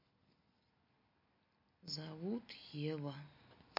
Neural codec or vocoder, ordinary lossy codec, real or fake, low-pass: none; MP3, 32 kbps; real; 5.4 kHz